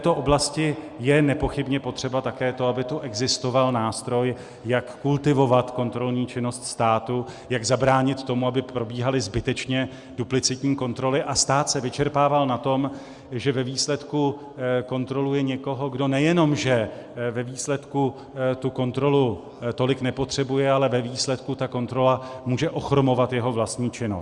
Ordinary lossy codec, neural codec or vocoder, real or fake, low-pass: Opus, 64 kbps; none; real; 10.8 kHz